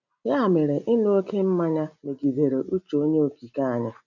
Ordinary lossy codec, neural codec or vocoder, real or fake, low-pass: none; none; real; 7.2 kHz